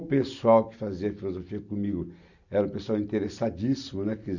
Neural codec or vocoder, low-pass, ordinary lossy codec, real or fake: none; 7.2 kHz; none; real